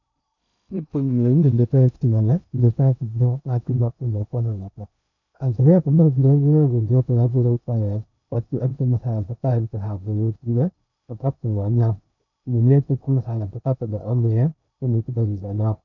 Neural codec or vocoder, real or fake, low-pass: codec, 16 kHz in and 24 kHz out, 0.6 kbps, FocalCodec, streaming, 2048 codes; fake; 7.2 kHz